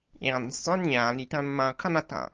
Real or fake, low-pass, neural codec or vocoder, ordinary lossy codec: real; 7.2 kHz; none; Opus, 24 kbps